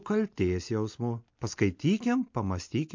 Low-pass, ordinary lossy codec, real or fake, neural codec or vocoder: 7.2 kHz; MP3, 48 kbps; real; none